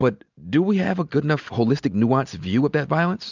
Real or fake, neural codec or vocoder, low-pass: real; none; 7.2 kHz